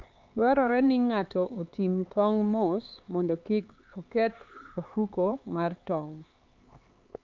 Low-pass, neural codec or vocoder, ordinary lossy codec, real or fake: 7.2 kHz; codec, 16 kHz, 4 kbps, X-Codec, HuBERT features, trained on LibriSpeech; Opus, 24 kbps; fake